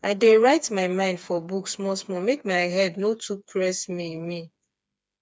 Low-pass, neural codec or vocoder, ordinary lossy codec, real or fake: none; codec, 16 kHz, 4 kbps, FreqCodec, smaller model; none; fake